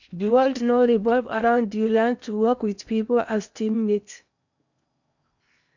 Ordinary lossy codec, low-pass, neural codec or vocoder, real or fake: none; 7.2 kHz; codec, 16 kHz in and 24 kHz out, 0.8 kbps, FocalCodec, streaming, 65536 codes; fake